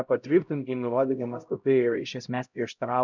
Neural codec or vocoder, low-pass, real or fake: codec, 16 kHz, 0.5 kbps, X-Codec, HuBERT features, trained on LibriSpeech; 7.2 kHz; fake